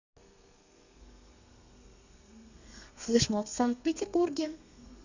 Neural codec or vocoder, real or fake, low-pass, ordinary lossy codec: codec, 32 kHz, 1.9 kbps, SNAC; fake; 7.2 kHz; none